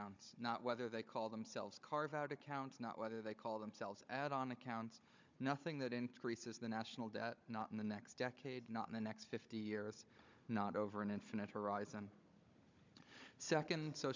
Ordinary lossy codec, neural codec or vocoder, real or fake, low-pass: MP3, 64 kbps; codec, 16 kHz, 16 kbps, FunCodec, trained on Chinese and English, 50 frames a second; fake; 7.2 kHz